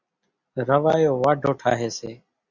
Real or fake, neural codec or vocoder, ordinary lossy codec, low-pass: real; none; Opus, 64 kbps; 7.2 kHz